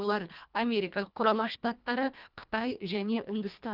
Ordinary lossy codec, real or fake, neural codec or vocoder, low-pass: Opus, 32 kbps; fake; codec, 24 kHz, 1.5 kbps, HILCodec; 5.4 kHz